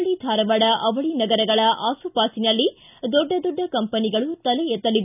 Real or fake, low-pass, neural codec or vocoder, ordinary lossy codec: real; 3.6 kHz; none; none